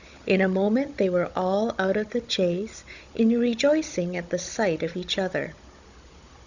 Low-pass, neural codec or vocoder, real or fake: 7.2 kHz; codec, 16 kHz, 16 kbps, FunCodec, trained on Chinese and English, 50 frames a second; fake